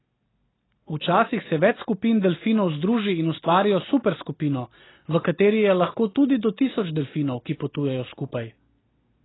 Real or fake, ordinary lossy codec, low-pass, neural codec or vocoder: real; AAC, 16 kbps; 7.2 kHz; none